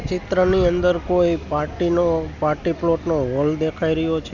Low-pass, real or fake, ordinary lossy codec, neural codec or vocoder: 7.2 kHz; real; none; none